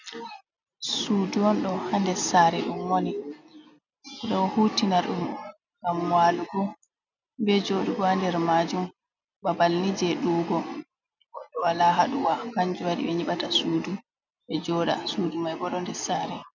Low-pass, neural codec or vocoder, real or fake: 7.2 kHz; none; real